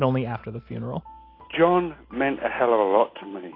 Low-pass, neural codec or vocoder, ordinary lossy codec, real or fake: 5.4 kHz; none; AAC, 24 kbps; real